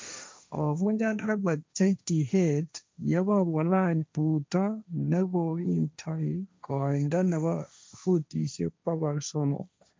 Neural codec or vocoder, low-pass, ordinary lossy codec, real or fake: codec, 16 kHz, 1.1 kbps, Voila-Tokenizer; none; none; fake